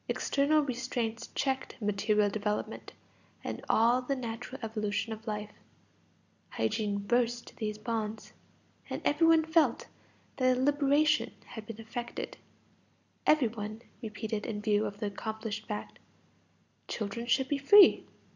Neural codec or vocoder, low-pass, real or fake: none; 7.2 kHz; real